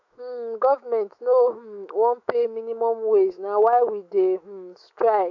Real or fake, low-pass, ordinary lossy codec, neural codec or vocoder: fake; 7.2 kHz; AAC, 48 kbps; autoencoder, 48 kHz, 128 numbers a frame, DAC-VAE, trained on Japanese speech